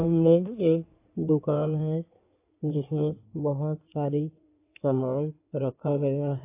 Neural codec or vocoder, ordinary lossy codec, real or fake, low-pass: codec, 16 kHz in and 24 kHz out, 2.2 kbps, FireRedTTS-2 codec; AAC, 24 kbps; fake; 3.6 kHz